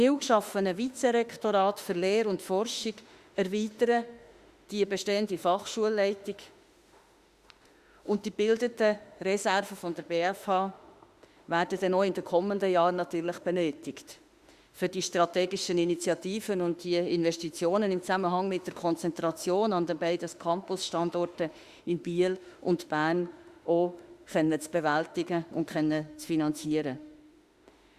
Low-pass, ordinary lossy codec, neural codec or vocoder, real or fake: 14.4 kHz; Opus, 64 kbps; autoencoder, 48 kHz, 32 numbers a frame, DAC-VAE, trained on Japanese speech; fake